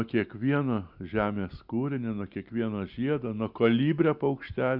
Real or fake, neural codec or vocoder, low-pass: real; none; 5.4 kHz